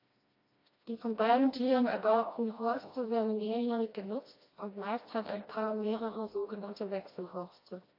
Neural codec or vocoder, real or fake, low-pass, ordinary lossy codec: codec, 16 kHz, 1 kbps, FreqCodec, smaller model; fake; 5.4 kHz; AAC, 32 kbps